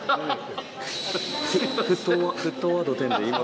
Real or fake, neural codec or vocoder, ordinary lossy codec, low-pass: real; none; none; none